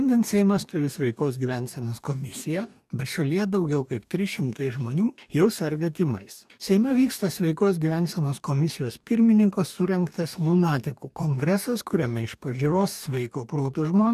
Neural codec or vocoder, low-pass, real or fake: codec, 44.1 kHz, 2.6 kbps, DAC; 14.4 kHz; fake